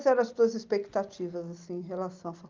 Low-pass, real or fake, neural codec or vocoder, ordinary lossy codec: 7.2 kHz; real; none; Opus, 32 kbps